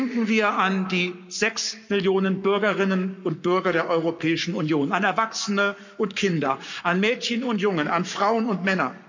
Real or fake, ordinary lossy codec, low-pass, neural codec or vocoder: fake; none; 7.2 kHz; codec, 44.1 kHz, 7.8 kbps, Pupu-Codec